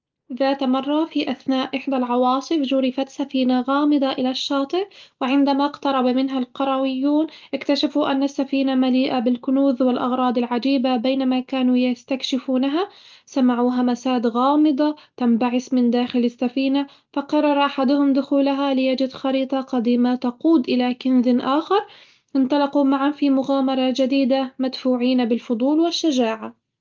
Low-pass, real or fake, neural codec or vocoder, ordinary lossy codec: 7.2 kHz; real; none; Opus, 24 kbps